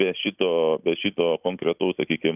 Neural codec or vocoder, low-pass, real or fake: none; 3.6 kHz; real